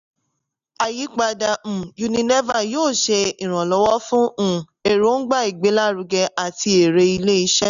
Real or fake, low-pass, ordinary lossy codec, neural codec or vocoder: real; 7.2 kHz; none; none